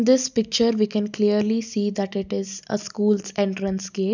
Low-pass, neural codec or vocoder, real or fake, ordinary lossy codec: 7.2 kHz; none; real; none